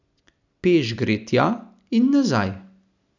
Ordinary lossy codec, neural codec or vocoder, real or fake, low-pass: none; none; real; 7.2 kHz